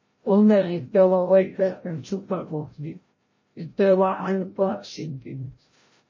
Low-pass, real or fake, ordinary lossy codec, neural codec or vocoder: 7.2 kHz; fake; MP3, 32 kbps; codec, 16 kHz, 0.5 kbps, FreqCodec, larger model